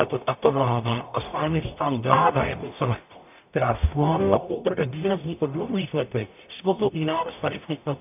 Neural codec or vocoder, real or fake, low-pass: codec, 44.1 kHz, 0.9 kbps, DAC; fake; 3.6 kHz